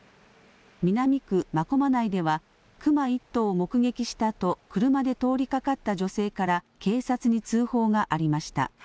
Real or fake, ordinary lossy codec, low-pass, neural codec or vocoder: real; none; none; none